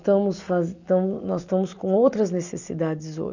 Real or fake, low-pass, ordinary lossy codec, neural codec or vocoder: real; 7.2 kHz; none; none